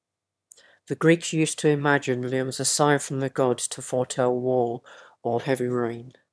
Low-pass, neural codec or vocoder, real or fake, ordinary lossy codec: none; autoencoder, 22.05 kHz, a latent of 192 numbers a frame, VITS, trained on one speaker; fake; none